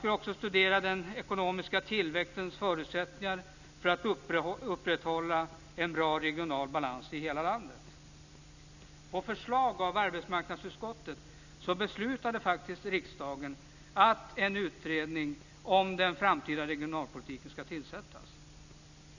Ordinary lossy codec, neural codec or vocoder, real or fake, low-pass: none; none; real; 7.2 kHz